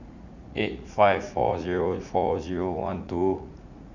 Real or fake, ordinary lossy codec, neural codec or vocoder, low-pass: fake; none; vocoder, 44.1 kHz, 80 mel bands, Vocos; 7.2 kHz